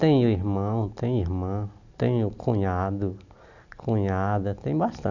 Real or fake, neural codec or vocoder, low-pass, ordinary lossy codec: real; none; 7.2 kHz; none